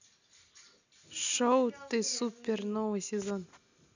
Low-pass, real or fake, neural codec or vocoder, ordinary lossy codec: 7.2 kHz; real; none; none